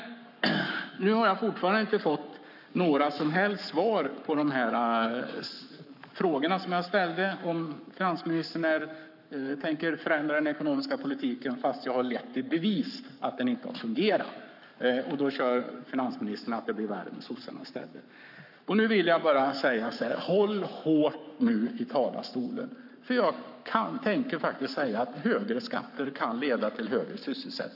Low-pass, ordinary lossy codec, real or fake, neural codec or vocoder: 5.4 kHz; none; fake; codec, 44.1 kHz, 7.8 kbps, Pupu-Codec